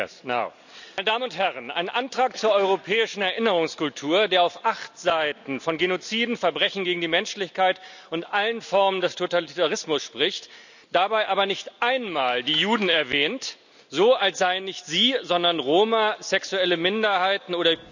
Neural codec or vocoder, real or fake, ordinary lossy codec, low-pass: none; real; none; 7.2 kHz